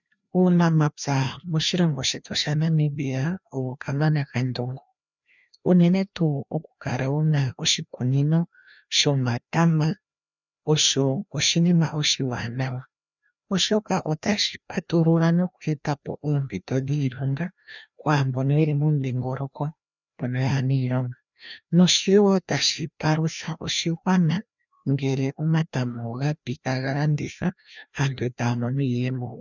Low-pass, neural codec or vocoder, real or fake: 7.2 kHz; codec, 16 kHz, 1 kbps, FreqCodec, larger model; fake